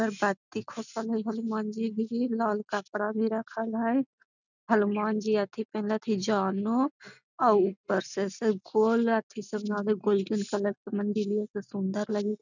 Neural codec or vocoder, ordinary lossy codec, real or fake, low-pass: none; none; real; 7.2 kHz